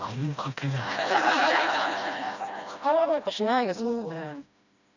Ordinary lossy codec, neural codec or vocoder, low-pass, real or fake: none; codec, 16 kHz, 1 kbps, FreqCodec, smaller model; 7.2 kHz; fake